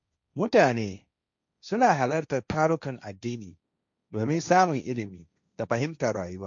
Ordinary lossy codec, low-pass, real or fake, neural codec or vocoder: none; 7.2 kHz; fake; codec, 16 kHz, 1.1 kbps, Voila-Tokenizer